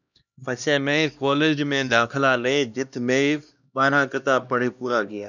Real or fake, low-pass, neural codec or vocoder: fake; 7.2 kHz; codec, 16 kHz, 1 kbps, X-Codec, HuBERT features, trained on LibriSpeech